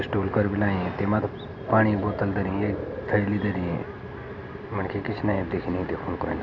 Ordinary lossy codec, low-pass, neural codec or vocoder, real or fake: AAC, 48 kbps; 7.2 kHz; none; real